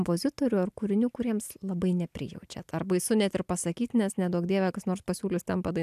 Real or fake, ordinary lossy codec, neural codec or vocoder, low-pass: real; AAC, 96 kbps; none; 14.4 kHz